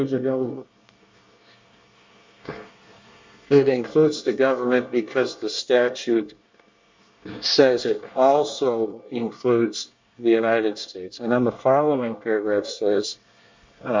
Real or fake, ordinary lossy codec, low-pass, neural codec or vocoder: fake; MP3, 48 kbps; 7.2 kHz; codec, 24 kHz, 1 kbps, SNAC